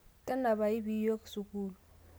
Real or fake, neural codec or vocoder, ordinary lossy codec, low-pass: real; none; none; none